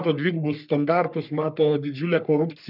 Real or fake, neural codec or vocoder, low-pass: fake; codec, 44.1 kHz, 3.4 kbps, Pupu-Codec; 5.4 kHz